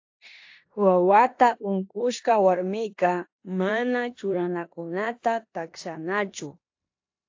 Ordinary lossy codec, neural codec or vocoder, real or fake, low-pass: AAC, 48 kbps; codec, 16 kHz in and 24 kHz out, 0.9 kbps, LongCat-Audio-Codec, four codebook decoder; fake; 7.2 kHz